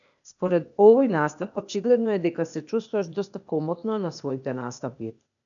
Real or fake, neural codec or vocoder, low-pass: fake; codec, 16 kHz, 0.8 kbps, ZipCodec; 7.2 kHz